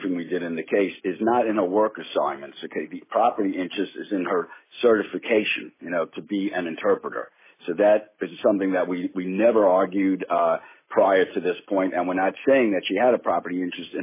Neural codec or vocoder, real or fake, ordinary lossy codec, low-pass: none; real; MP3, 16 kbps; 3.6 kHz